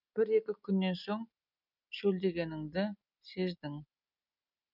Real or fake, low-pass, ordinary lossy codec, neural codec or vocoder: real; 5.4 kHz; none; none